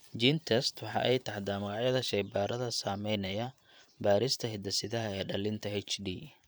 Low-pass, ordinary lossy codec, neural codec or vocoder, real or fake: none; none; vocoder, 44.1 kHz, 128 mel bands every 512 samples, BigVGAN v2; fake